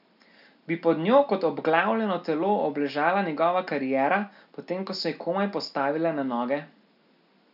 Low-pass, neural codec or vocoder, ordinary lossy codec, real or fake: 5.4 kHz; none; none; real